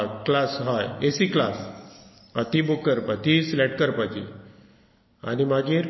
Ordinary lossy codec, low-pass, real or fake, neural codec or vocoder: MP3, 24 kbps; 7.2 kHz; real; none